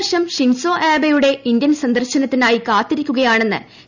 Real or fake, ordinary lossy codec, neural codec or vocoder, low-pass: real; none; none; 7.2 kHz